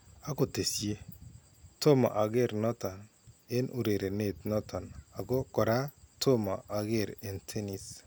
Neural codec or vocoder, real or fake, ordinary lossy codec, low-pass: none; real; none; none